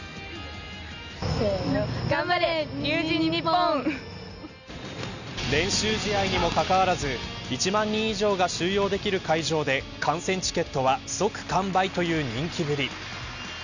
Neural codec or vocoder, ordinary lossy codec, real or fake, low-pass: none; none; real; 7.2 kHz